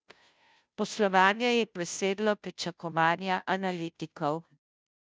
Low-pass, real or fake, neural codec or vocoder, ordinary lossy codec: none; fake; codec, 16 kHz, 0.5 kbps, FunCodec, trained on Chinese and English, 25 frames a second; none